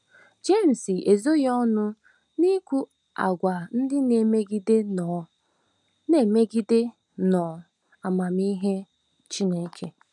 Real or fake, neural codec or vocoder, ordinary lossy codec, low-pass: real; none; none; 10.8 kHz